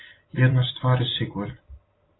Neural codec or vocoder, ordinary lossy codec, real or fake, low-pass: none; AAC, 16 kbps; real; 7.2 kHz